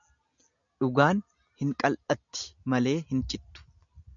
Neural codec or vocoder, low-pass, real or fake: none; 7.2 kHz; real